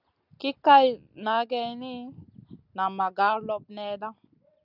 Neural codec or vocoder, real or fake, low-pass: none; real; 5.4 kHz